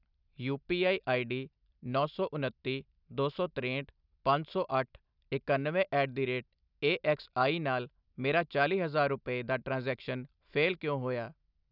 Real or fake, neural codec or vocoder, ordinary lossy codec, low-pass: real; none; none; 5.4 kHz